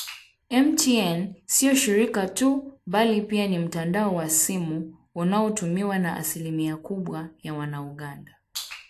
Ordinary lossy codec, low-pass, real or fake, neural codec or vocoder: AAC, 64 kbps; 14.4 kHz; real; none